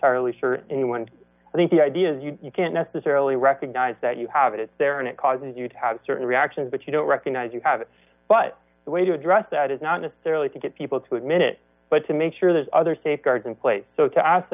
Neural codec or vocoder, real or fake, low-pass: none; real; 3.6 kHz